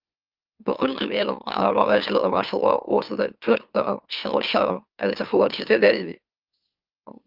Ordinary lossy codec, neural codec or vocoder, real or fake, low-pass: Opus, 24 kbps; autoencoder, 44.1 kHz, a latent of 192 numbers a frame, MeloTTS; fake; 5.4 kHz